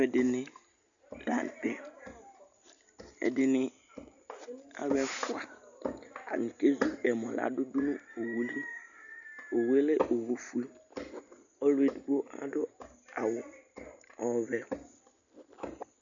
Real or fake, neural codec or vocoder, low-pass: real; none; 7.2 kHz